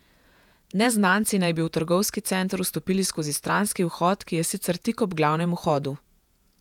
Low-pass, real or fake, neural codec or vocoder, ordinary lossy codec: 19.8 kHz; fake; vocoder, 48 kHz, 128 mel bands, Vocos; none